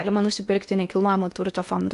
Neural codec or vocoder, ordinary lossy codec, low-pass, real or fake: codec, 16 kHz in and 24 kHz out, 0.8 kbps, FocalCodec, streaming, 65536 codes; AAC, 64 kbps; 10.8 kHz; fake